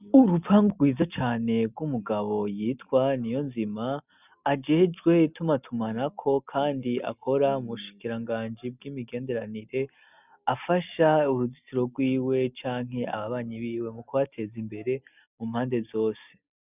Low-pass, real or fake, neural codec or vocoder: 3.6 kHz; real; none